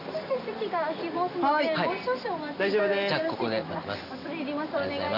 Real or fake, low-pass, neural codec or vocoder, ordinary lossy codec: real; 5.4 kHz; none; none